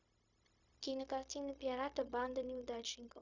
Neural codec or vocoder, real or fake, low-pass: codec, 16 kHz, 0.4 kbps, LongCat-Audio-Codec; fake; 7.2 kHz